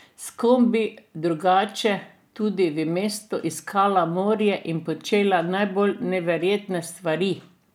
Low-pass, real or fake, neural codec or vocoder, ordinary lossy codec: 19.8 kHz; real; none; none